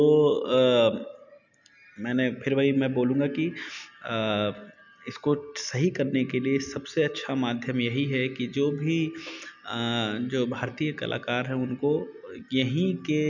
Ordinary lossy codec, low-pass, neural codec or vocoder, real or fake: none; 7.2 kHz; none; real